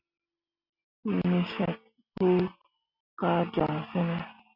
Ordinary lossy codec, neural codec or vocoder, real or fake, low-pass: MP3, 48 kbps; none; real; 5.4 kHz